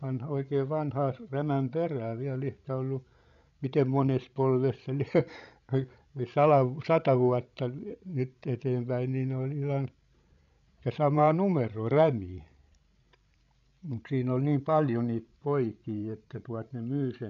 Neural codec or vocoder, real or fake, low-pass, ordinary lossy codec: codec, 16 kHz, 16 kbps, FreqCodec, larger model; fake; 7.2 kHz; none